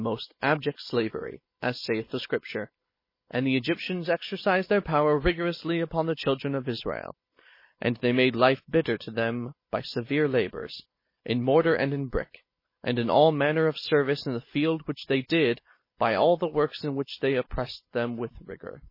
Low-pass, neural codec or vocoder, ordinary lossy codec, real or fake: 5.4 kHz; none; MP3, 24 kbps; real